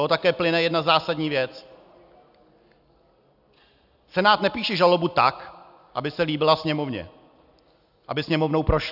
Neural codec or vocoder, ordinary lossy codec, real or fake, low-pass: none; AAC, 48 kbps; real; 5.4 kHz